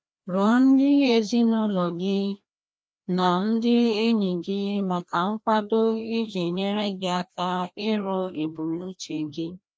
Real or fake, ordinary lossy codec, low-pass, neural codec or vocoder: fake; none; none; codec, 16 kHz, 1 kbps, FreqCodec, larger model